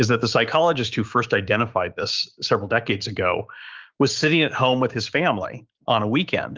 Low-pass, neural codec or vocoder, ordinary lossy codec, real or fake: 7.2 kHz; codec, 16 kHz, 6 kbps, DAC; Opus, 32 kbps; fake